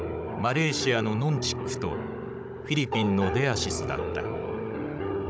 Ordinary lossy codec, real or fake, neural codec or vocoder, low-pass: none; fake; codec, 16 kHz, 16 kbps, FunCodec, trained on Chinese and English, 50 frames a second; none